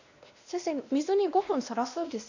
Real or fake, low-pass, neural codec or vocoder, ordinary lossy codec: fake; 7.2 kHz; codec, 16 kHz, 1 kbps, X-Codec, WavLM features, trained on Multilingual LibriSpeech; none